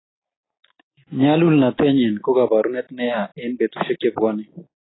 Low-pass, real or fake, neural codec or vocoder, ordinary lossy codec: 7.2 kHz; real; none; AAC, 16 kbps